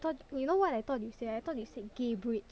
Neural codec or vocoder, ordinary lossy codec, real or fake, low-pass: none; none; real; none